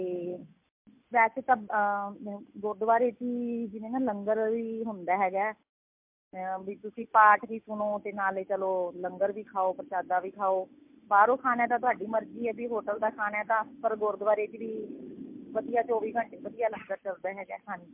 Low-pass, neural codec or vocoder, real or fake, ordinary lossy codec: 3.6 kHz; none; real; MP3, 32 kbps